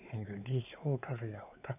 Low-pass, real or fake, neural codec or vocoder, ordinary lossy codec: 3.6 kHz; fake; codec, 16 kHz, 4 kbps, X-Codec, WavLM features, trained on Multilingual LibriSpeech; none